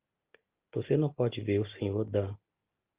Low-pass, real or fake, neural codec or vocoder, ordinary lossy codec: 3.6 kHz; real; none; Opus, 32 kbps